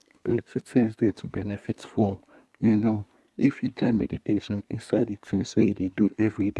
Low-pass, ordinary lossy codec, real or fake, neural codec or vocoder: none; none; fake; codec, 24 kHz, 1 kbps, SNAC